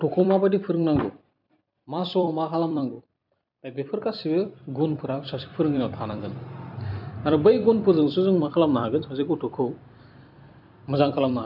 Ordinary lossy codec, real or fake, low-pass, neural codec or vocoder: none; fake; 5.4 kHz; vocoder, 44.1 kHz, 128 mel bands every 256 samples, BigVGAN v2